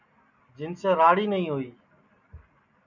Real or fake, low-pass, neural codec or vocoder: real; 7.2 kHz; none